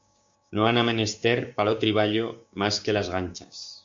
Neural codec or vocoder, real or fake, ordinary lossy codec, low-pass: codec, 16 kHz, 6 kbps, DAC; fake; MP3, 48 kbps; 7.2 kHz